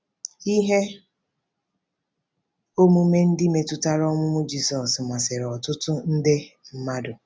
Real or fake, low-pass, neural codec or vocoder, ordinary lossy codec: real; none; none; none